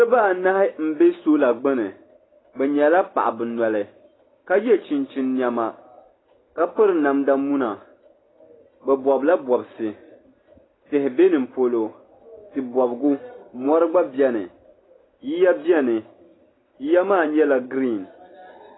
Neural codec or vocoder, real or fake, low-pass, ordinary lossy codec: none; real; 7.2 kHz; AAC, 16 kbps